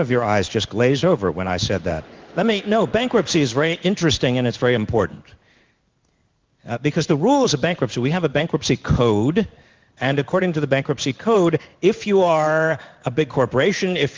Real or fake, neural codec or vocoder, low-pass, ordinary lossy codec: fake; codec, 16 kHz in and 24 kHz out, 1 kbps, XY-Tokenizer; 7.2 kHz; Opus, 24 kbps